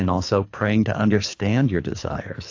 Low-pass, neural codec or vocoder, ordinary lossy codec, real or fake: 7.2 kHz; codec, 24 kHz, 3 kbps, HILCodec; AAC, 48 kbps; fake